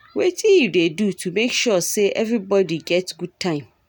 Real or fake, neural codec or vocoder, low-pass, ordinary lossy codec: real; none; none; none